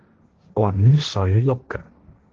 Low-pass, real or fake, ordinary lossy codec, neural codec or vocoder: 7.2 kHz; fake; Opus, 16 kbps; codec, 16 kHz, 1.1 kbps, Voila-Tokenizer